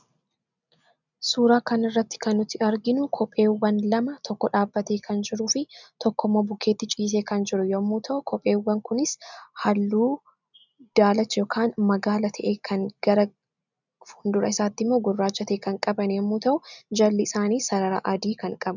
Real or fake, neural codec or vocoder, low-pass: real; none; 7.2 kHz